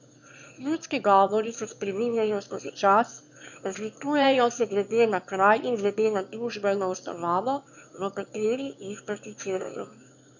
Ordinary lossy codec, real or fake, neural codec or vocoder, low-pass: none; fake; autoencoder, 22.05 kHz, a latent of 192 numbers a frame, VITS, trained on one speaker; 7.2 kHz